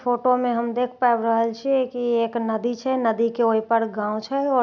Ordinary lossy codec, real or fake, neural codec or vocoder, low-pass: none; real; none; 7.2 kHz